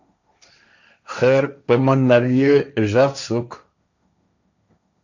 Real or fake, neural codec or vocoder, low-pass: fake; codec, 16 kHz, 1.1 kbps, Voila-Tokenizer; 7.2 kHz